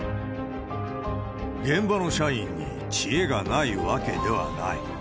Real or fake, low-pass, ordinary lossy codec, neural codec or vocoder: real; none; none; none